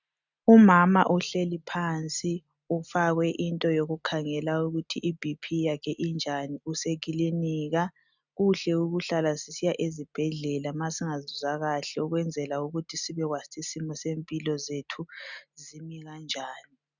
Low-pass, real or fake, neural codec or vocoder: 7.2 kHz; real; none